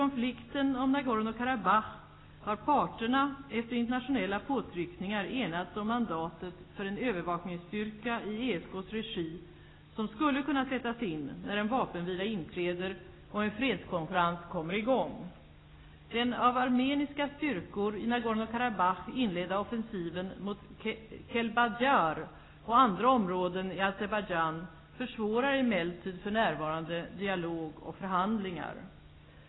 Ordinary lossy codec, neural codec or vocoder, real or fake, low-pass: AAC, 16 kbps; none; real; 7.2 kHz